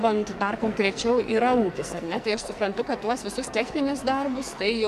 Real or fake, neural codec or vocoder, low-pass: fake; codec, 44.1 kHz, 2.6 kbps, SNAC; 14.4 kHz